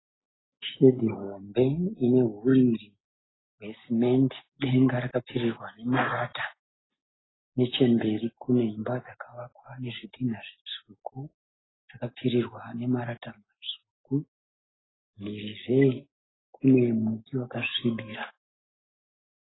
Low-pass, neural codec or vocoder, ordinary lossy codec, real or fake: 7.2 kHz; none; AAC, 16 kbps; real